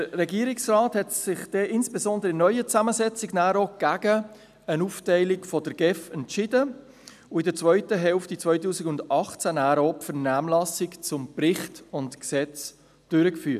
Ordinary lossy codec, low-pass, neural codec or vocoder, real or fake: none; 14.4 kHz; none; real